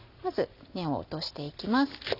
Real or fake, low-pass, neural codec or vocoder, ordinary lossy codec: real; 5.4 kHz; none; none